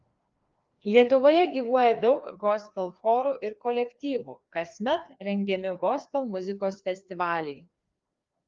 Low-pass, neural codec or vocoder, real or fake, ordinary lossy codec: 7.2 kHz; codec, 16 kHz, 2 kbps, FreqCodec, larger model; fake; Opus, 32 kbps